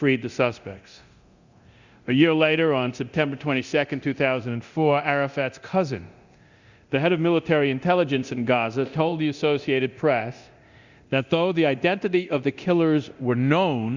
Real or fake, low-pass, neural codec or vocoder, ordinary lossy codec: fake; 7.2 kHz; codec, 24 kHz, 0.9 kbps, DualCodec; Opus, 64 kbps